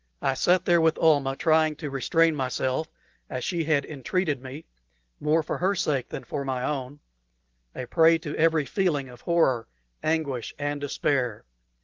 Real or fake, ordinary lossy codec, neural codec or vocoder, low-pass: real; Opus, 16 kbps; none; 7.2 kHz